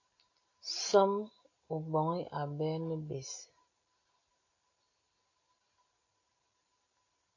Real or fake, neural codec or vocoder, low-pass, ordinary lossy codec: real; none; 7.2 kHz; AAC, 48 kbps